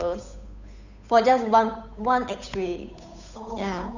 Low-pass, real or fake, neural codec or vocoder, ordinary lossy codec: 7.2 kHz; fake; codec, 16 kHz, 8 kbps, FunCodec, trained on Chinese and English, 25 frames a second; none